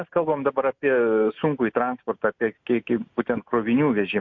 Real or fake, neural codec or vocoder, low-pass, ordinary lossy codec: real; none; 7.2 kHz; MP3, 48 kbps